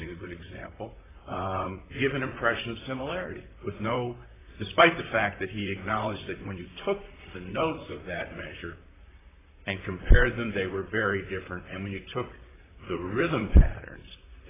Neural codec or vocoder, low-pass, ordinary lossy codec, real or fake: vocoder, 44.1 kHz, 128 mel bands, Pupu-Vocoder; 3.6 kHz; AAC, 16 kbps; fake